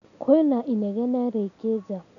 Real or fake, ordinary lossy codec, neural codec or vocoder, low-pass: real; none; none; 7.2 kHz